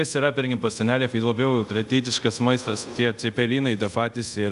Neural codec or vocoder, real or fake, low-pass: codec, 24 kHz, 0.5 kbps, DualCodec; fake; 10.8 kHz